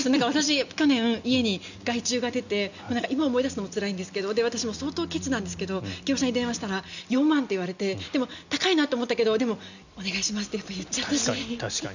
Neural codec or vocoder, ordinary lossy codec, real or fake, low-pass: none; none; real; 7.2 kHz